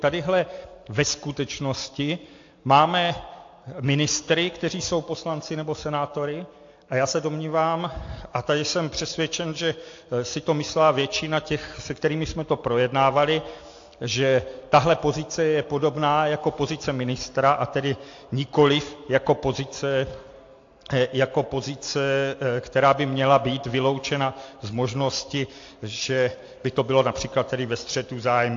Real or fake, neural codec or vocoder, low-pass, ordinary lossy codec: real; none; 7.2 kHz; AAC, 48 kbps